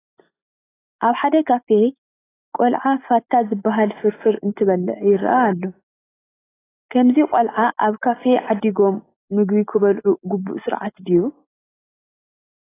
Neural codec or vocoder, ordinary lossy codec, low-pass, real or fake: none; AAC, 16 kbps; 3.6 kHz; real